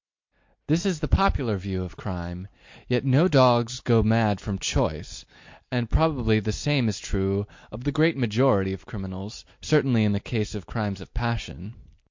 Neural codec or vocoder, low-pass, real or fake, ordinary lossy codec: none; 7.2 kHz; real; MP3, 48 kbps